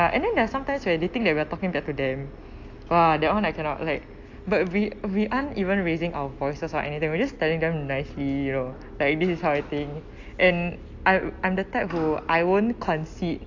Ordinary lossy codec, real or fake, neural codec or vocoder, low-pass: AAC, 48 kbps; real; none; 7.2 kHz